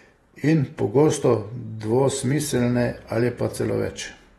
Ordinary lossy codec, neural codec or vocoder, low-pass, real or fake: AAC, 32 kbps; vocoder, 48 kHz, 128 mel bands, Vocos; 19.8 kHz; fake